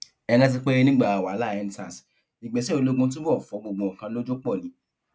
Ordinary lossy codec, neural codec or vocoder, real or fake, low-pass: none; none; real; none